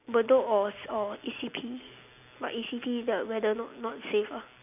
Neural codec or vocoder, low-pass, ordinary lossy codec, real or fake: none; 3.6 kHz; none; real